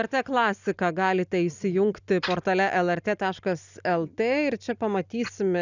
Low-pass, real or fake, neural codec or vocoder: 7.2 kHz; real; none